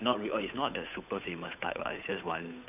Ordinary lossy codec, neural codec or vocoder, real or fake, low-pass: none; codec, 16 kHz, 8 kbps, FunCodec, trained on Chinese and English, 25 frames a second; fake; 3.6 kHz